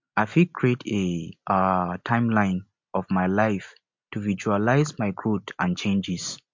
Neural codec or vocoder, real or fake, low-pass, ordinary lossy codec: none; real; 7.2 kHz; MP3, 48 kbps